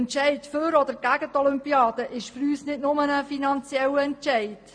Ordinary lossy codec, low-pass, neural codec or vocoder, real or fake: MP3, 64 kbps; 9.9 kHz; none; real